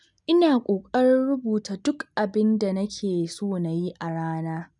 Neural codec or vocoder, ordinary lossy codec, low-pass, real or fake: none; none; 10.8 kHz; real